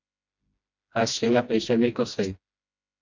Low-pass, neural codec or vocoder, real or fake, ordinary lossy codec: 7.2 kHz; codec, 16 kHz, 1 kbps, FreqCodec, smaller model; fake; MP3, 64 kbps